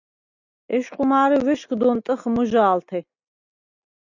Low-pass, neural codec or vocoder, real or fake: 7.2 kHz; none; real